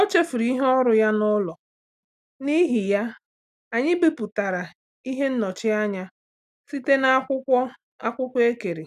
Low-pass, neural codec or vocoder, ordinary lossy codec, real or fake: 14.4 kHz; none; none; real